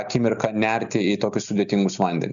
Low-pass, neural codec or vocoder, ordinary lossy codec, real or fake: 7.2 kHz; none; MP3, 96 kbps; real